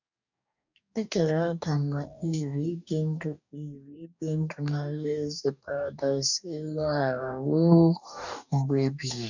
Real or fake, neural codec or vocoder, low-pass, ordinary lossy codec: fake; codec, 44.1 kHz, 2.6 kbps, DAC; 7.2 kHz; none